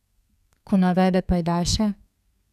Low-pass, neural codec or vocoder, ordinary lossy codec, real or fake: 14.4 kHz; codec, 32 kHz, 1.9 kbps, SNAC; none; fake